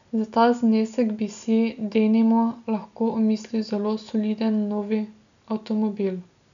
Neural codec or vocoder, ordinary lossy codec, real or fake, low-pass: none; none; real; 7.2 kHz